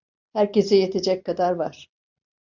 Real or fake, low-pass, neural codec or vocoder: real; 7.2 kHz; none